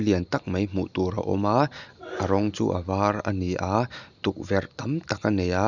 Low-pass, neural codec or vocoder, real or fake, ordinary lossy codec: 7.2 kHz; none; real; none